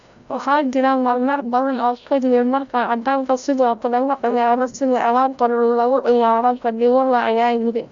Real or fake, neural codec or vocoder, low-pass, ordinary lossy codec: fake; codec, 16 kHz, 0.5 kbps, FreqCodec, larger model; 7.2 kHz; Opus, 64 kbps